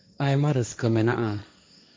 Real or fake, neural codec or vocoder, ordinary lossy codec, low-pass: fake; codec, 16 kHz, 1.1 kbps, Voila-Tokenizer; none; none